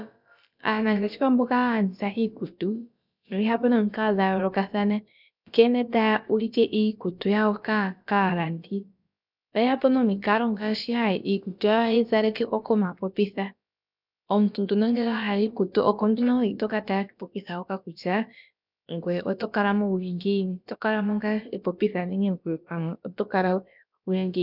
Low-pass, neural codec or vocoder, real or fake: 5.4 kHz; codec, 16 kHz, about 1 kbps, DyCAST, with the encoder's durations; fake